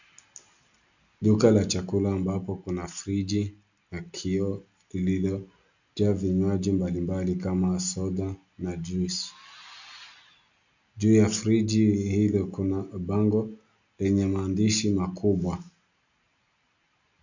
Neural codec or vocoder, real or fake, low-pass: none; real; 7.2 kHz